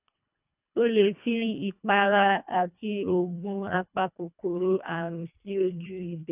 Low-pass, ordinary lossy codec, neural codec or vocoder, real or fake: 3.6 kHz; none; codec, 24 kHz, 1.5 kbps, HILCodec; fake